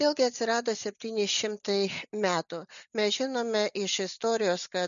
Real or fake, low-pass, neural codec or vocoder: real; 7.2 kHz; none